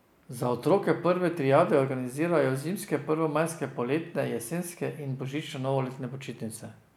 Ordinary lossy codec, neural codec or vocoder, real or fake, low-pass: none; none; real; 19.8 kHz